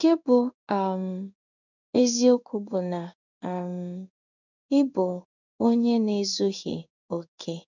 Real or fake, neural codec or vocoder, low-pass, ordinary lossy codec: fake; codec, 16 kHz in and 24 kHz out, 1 kbps, XY-Tokenizer; 7.2 kHz; none